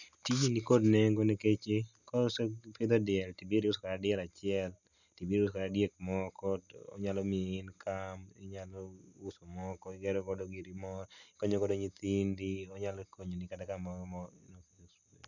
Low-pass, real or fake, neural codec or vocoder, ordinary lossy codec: 7.2 kHz; real; none; none